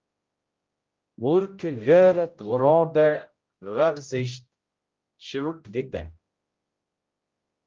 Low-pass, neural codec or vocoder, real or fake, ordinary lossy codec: 7.2 kHz; codec, 16 kHz, 0.5 kbps, X-Codec, HuBERT features, trained on general audio; fake; Opus, 32 kbps